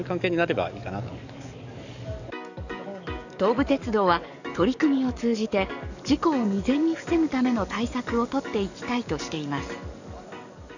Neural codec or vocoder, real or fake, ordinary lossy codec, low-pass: codec, 44.1 kHz, 7.8 kbps, DAC; fake; none; 7.2 kHz